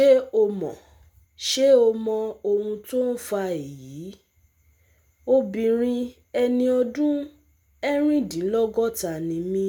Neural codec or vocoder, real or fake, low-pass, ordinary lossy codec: none; real; 19.8 kHz; none